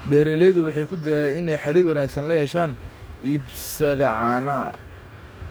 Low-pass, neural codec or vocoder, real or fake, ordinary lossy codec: none; codec, 44.1 kHz, 2.6 kbps, DAC; fake; none